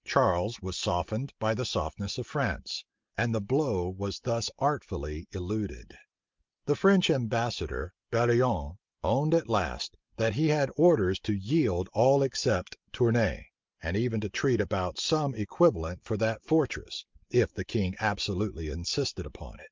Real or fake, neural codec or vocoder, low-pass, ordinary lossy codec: real; none; 7.2 kHz; Opus, 24 kbps